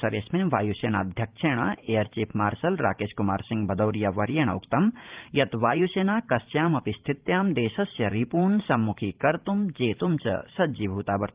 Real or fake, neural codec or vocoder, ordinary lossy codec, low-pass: fake; vocoder, 44.1 kHz, 128 mel bands every 512 samples, BigVGAN v2; Opus, 32 kbps; 3.6 kHz